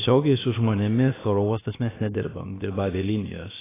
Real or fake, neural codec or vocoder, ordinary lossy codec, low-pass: fake; codec, 16 kHz, about 1 kbps, DyCAST, with the encoder's durations; AAC, 16 kbps; 3.6 kHz